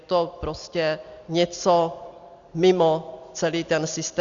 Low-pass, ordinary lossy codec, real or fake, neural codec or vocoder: 7.2 kHz; Opus, 64 kbps; real; none